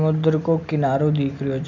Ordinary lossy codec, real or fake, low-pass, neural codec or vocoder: none; real; 7.2 kHz; none